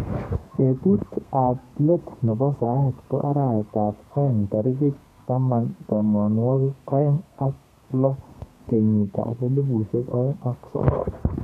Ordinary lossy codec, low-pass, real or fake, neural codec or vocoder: none; 14.4 kHz; fake; codec, 32 kHz, 1.9 kbps, SNAC